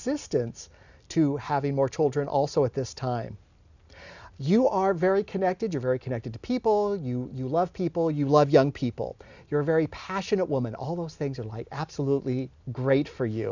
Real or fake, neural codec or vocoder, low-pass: real; none; 7.2 kHz